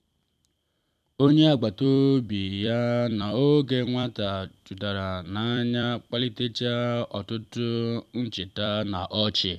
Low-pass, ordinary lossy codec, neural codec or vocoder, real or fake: 14.4 kHz; none; vocoder, 44.1 kHz, 128 mel bands every 256 samples, BigVGAN v2; fake